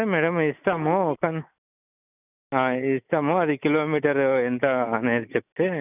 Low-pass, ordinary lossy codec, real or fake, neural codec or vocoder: 3.6 kHz; none; real; none